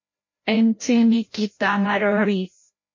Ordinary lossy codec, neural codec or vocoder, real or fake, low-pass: MP3, 32 kbps; codec, 16 kHz, 0.5 kbps, FreqCodec, larger model; fake; 7.2 kHz